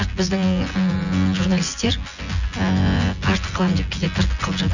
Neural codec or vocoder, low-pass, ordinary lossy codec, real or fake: vocoder, 24 kHz, 100 mel bands, Vocos; 7.2 kHz; none; fake